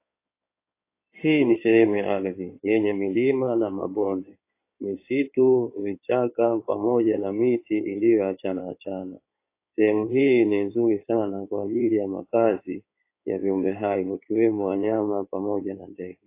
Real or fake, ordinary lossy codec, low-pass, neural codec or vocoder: fake; AAC, 24 kbps; 3.6 kHz; codec, 16 kHz in and 24 kHz out, 2.2 kbps, FireRedTTS-2 codec